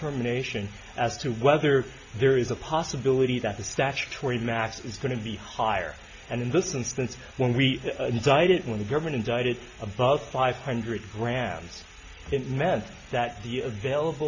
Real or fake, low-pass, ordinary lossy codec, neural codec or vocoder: real; 7.2 kHz; AAC, 48 kbps; none